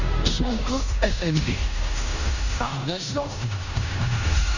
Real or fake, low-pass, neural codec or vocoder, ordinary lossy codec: fake; 7.2 kHz; codec, 16 kHz in and 24 kHz out, 0.9 kbps, LongCat-Audio-Codec, fine tuned four codebook decoder; none